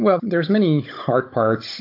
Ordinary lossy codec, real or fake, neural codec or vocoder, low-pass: AAC, 32 kbps; fake; codec, 16 kHz, 16 kbps, FunCodec, trained on Chinese and English, 50 frames a second; 5.4 kHz